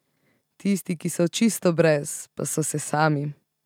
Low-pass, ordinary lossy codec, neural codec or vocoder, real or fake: 19.8 kHz; none; none; real